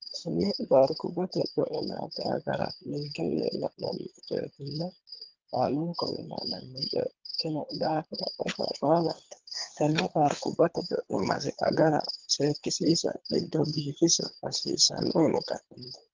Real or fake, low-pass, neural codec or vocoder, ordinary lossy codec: fake; 7.2 kHz; codec, 24 kHz, 3 kbps, HILCodec; Opus, 24 kbps